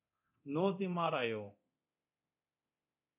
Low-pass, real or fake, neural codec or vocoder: 3.6 kHz; fake; codec, 24 kHz, 0.9 kbps, DualCodec